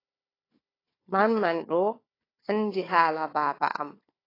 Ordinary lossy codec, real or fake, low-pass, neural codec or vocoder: AAC, 24 kbps; fake; 5.4 kHz; codec, 16 kHz, 4 kbps, FunCodec, trained on Chinese and English, 50 frames a second